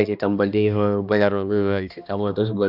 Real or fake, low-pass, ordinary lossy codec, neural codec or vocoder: fake; 5.4 kHz; none; codec, 16 kHz, 1 kbps, X-Codec, HuBERT features, trained on balanced general audio